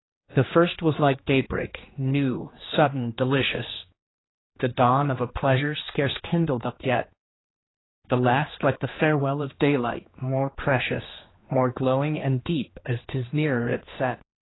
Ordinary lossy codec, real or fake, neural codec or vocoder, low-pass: AAC, 16 kbps; fake; codec, 16 kHz, 2 kbps, X-Codec, HuBERT features, trained on general audio; 7.2 kHz